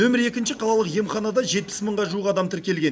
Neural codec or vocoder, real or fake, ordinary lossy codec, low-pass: none; real; none; none